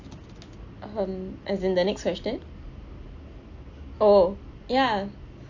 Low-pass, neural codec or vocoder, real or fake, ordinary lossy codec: 7.2 kHz; none; real; AAC, 48 kbps